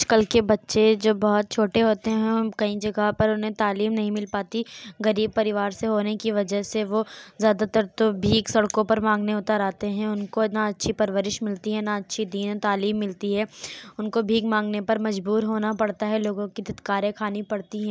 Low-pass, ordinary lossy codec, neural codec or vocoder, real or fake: none; none; none; real